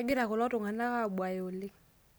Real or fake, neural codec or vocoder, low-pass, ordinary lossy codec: real; none; none; none